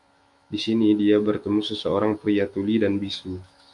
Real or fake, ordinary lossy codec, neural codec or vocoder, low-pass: fake; MP3, 64 kbps; autoencoder, 48 kHz, 128 numbers a frame, DAC-VAE, trained on Japanese speech; 10.8 kHz